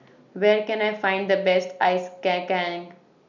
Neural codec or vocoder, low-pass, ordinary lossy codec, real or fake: none; 7.2 kHz; none; real